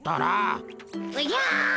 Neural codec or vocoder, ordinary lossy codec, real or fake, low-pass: none; none; real; none